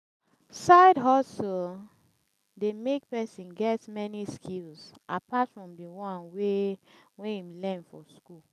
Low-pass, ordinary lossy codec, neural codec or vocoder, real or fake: 14.4 kHz; none; none; real